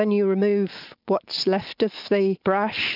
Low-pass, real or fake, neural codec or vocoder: 5.4 kHz; real; none